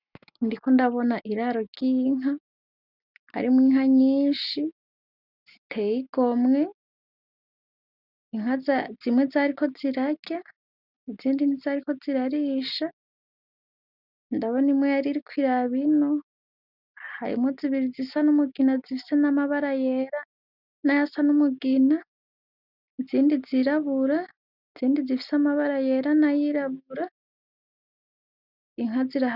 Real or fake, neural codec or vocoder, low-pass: real; none; 5.4 kHz